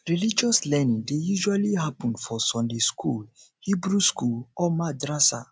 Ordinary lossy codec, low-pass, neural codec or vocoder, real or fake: none; none; none; real